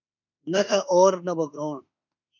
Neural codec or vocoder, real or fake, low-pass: autoencoder, 48 kHz, 32 numbers a frame, DAC-VAE, trained on Japanese speech; fake; 7.2 kHz